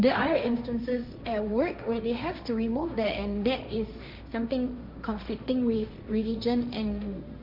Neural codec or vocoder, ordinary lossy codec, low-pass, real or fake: codec, 16 kHz, 1.1 kbps, Voila-Tokenizer; MP3, 48 kbps; 5.4 kHz; fake